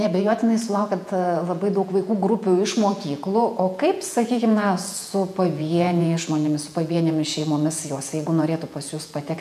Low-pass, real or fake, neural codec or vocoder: 14.4 kHz; fake; vocoder, 48 kHz, 128 mel bands, Vocos